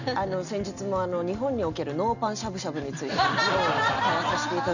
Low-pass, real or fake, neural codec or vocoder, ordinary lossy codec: 7.2 kHz; real; none; none